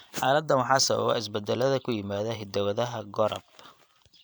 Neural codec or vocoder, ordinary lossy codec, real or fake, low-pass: vocoder, 44.1 kHz, 128 mel bands every 512 samples, BigVGAN v2; none; fake; none